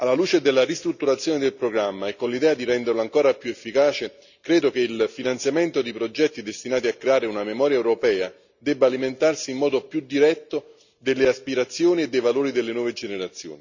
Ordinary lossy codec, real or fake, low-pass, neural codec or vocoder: none; real; 7.2 kHz; none